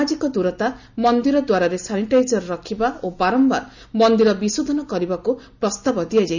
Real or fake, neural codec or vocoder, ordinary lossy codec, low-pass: real; none; none; 7.2 kHz